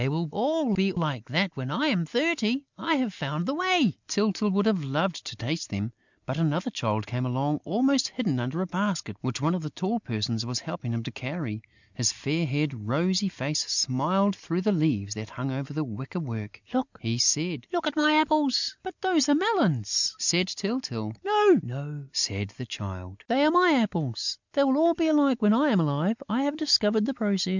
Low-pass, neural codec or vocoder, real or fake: 7.2 kHz; none; real